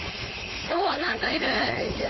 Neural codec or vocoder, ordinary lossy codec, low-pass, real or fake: codec, 16 kHz, 4.8 kbps, FACodec; MP3, 24 kbps; 7.2 kHz; fake